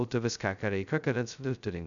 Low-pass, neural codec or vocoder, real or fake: 7.2 kHz; codec, 16 kHz, 0.2 kbps, FocalCodec; fake